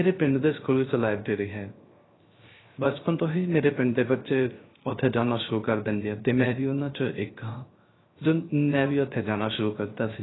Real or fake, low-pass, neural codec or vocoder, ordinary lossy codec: fake; 7.2 kHz; codec, 16 kHz, 0.3 kbps, FocalCodec; AAC, 16 kbps